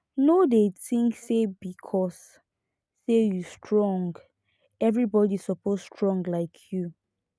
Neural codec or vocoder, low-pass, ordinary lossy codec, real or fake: none; none; none; real